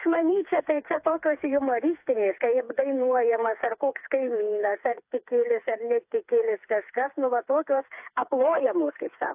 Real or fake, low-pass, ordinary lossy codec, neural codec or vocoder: fake; 3.6 kHz; AAC, 32 kbps; codec, 16 kHz, 4 kbps, FreqCodec, smaller model